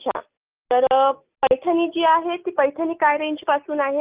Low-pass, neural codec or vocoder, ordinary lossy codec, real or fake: 3.6 kHz; none; Opus, 24 kbps; real